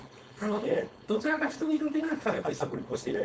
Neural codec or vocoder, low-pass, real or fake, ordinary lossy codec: codec, 16 kHz, 4.8 kbps, FACodec; none; fake; none